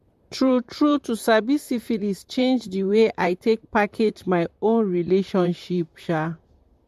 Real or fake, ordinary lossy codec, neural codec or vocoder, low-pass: fake; MP3, 64 kbps; vocoder, 44.1 kHz, 128 mel bands, Pupu-Vocoder; 14.4 kHz